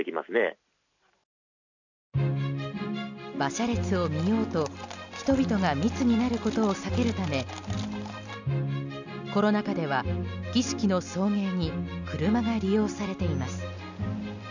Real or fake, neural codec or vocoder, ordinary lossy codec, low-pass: real; none; none; 7.2 kHz